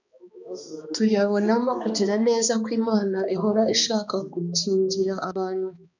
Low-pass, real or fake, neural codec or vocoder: 7.2 kHz; fake; codec, 16 kHz, 2 kbps, X-Codec, HuBERT features, trained on balanced general audio